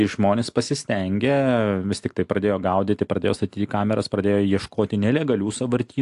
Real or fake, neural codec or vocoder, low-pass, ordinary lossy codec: real; none; 10.8 kHz; AAC, 64 kbps